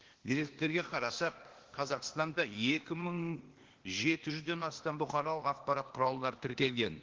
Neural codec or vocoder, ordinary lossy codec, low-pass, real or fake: codec, 16 kHz, 0.8 kbps, ZipCodec; Opus, 16 kbps; 7.2 kHz; fake